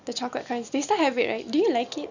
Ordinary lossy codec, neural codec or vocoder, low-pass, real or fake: none; none; 7.2 kHz; real